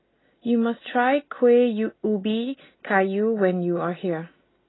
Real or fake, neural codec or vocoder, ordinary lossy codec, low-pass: real; none; AAC, 16 kbps; 7.2 kHz